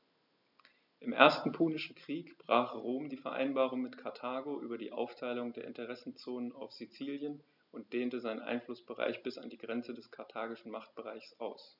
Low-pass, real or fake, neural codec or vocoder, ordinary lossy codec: 5.4 kHz; real; none; none